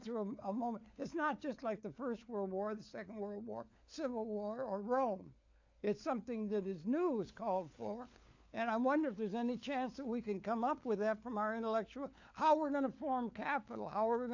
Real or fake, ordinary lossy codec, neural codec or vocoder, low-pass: fake; AAC, 48 kbps; codec, 16 kHz, 4 kbps, FunCodec, trained on LibriTTS, 50 frames a second; 7.2 kHz